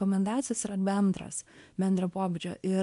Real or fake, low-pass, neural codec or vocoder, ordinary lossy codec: fake; 10.8 kHz; codec, 24 kHz, 0.9 kbps, WavTokenizer, small release; MP3, 64 kbps